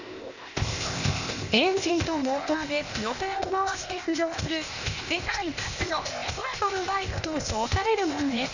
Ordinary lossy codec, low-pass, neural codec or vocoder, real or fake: none; 7.2 kHz; codec, 16 kHz, 0.8 kbps, ZipCodec; fake